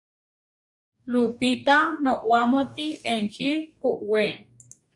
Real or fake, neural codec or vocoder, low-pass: fake; codec, 44.1 kHz, 2.6 kbps, DAC; 10.8 kHz